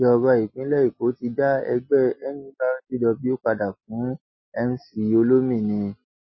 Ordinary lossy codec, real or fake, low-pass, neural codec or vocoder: MP3, 24 kbps; real; 7.2 kHz; none